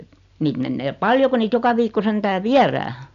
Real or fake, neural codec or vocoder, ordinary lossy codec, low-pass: real; none; none; 7.2 kHz